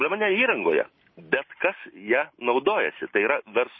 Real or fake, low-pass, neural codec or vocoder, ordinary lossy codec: real; 7.2 kHz; none; MP3, 24 kbps